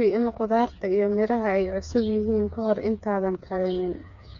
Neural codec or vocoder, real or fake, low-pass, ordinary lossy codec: codec, 16 kHz, 4 kbps, FreqCodec, smaller model; fake; 7.2 kHz; none